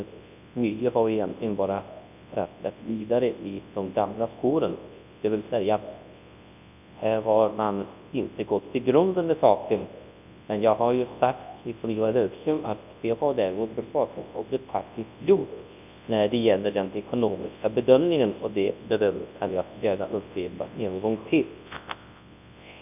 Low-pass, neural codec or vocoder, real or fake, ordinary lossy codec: 3.6 kHz; codec, 24 kHz, 0.9 kbps, WavTokenizer, large speech release; fake; none